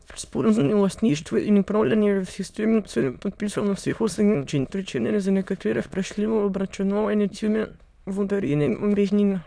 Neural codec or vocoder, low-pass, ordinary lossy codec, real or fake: autoencoder, 22.05 kHz, a latent of 192 numbers a frame, VITS, trained on many speakers; none; none; fake